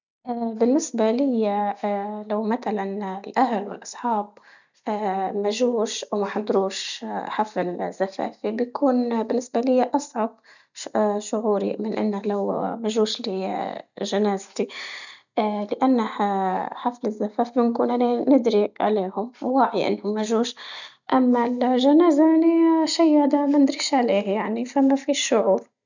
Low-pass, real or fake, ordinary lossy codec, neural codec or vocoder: 7.2 kHz; real; none; none